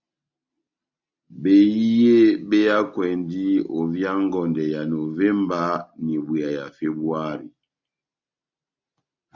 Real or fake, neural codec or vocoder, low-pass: real; none; 7.2 kHz